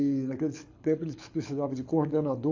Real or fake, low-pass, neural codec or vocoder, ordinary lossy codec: fake; 7.2 kHz; codec, 24 kHz, 6 kbps, HILCodec; AAC, 48 kbps